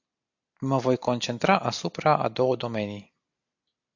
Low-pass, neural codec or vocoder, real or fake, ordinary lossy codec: 7.2 kHz; none; real; AAC, 48 kbps